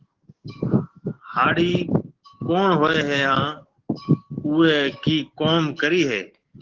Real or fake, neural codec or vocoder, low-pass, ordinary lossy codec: fake; codec, 44.1 kHz, 7.8 kbps, DAC; 7.2 kHz; Opus, 16 kbps